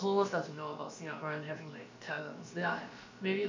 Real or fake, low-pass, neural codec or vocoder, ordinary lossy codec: fake; 7.2 kHz; codec, 16 kHz, about 1 kbps, DyCAST, with the encoder's durations; MP3, 64 kbps